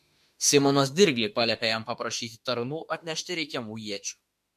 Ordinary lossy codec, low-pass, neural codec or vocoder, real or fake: MP3, 64 kbps; 14.4 kHz; autoencoder, 48 kHz, 32 numbers a frame, DAC-VAE, trained on Japanese speech; fake